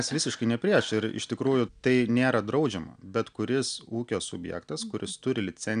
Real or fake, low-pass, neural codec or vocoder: real; 9.9 kHz; none